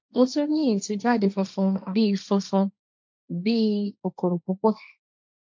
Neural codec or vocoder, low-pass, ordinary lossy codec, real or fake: codec, 16 kHz, 1.1 kbps, Voila-Tokenizer; 7.2 kHz; MP3, 64 kbps; fake